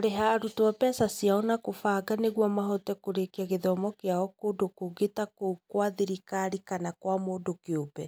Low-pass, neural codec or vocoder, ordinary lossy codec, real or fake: none; none; none; real